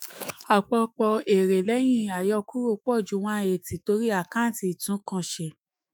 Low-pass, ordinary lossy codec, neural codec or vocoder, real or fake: none; none; autoencoder, 48 kHz, 128 numbers a frame, DAC-VAE, trained on Japanese speech; fake